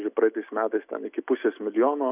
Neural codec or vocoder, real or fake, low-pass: none; real; 3.6 kHz